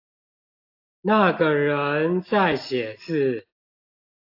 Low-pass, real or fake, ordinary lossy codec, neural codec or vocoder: 5.4 kHz; real; AAC, 32 kbps; none